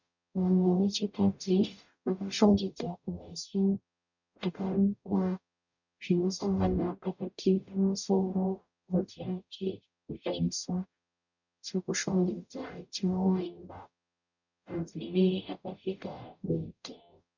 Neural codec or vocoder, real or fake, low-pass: codec, 44.1 kHz, 0.9 kbps, DAC; fake; 7.2 kHz